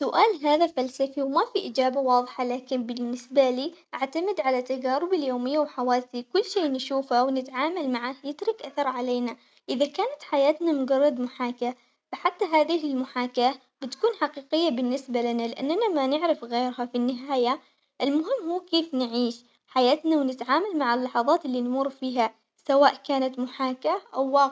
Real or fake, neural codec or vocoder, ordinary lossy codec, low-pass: real; none; none; none